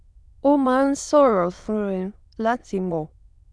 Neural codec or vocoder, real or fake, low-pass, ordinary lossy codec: autoencoder, 22.05 kHz, a latent of 192 numbers a frame, VITS, trained on many speakers; fake; none; none